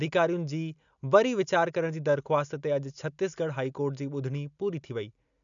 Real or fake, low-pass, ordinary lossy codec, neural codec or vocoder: real; 7.2 kHz; MP3, 96 kbps; none